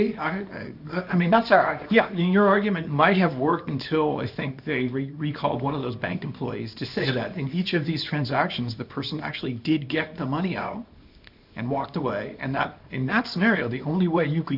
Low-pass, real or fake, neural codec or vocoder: 5.4 kHz; fake; codec, 24 kHz, 0.9 kbps, WavTokenizer, small release